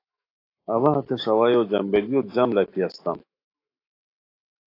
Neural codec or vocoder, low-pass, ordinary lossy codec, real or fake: autoencoder, 48 kHz, 128 numbers a frame, DAC-VAE, trained on Japanese speech; 5.4 kHz; AAC, 32 kbps; fake